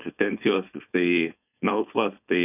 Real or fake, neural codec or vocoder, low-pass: fake; codec, 16 kHz, 4.8 kbps, FACodec; 3.6 kHz